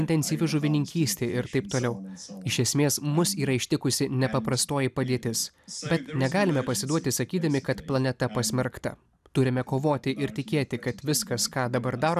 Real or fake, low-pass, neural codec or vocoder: real; 14.4 kHz; none